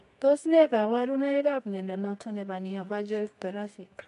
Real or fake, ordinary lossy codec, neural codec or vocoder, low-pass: fake; AAC, 96 kbps; codec, 24 kHz, 0.9 kbps, WavTokenizer, medium music audio release; 10.8 kHz